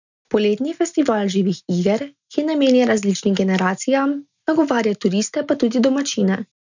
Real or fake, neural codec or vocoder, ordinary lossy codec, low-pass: real; none; none; 7.2 kHz